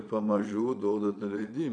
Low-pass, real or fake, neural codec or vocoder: 9.9 kHz; fake; vocoder, 22.05 kHz, 80 mel bands, Vocos